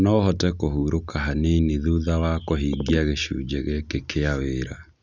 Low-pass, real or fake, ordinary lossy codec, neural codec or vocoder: 7.2 kHz; real; none; none